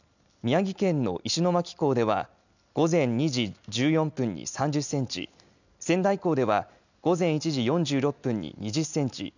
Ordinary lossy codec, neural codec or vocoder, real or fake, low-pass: none; none; real; 7.2 kHz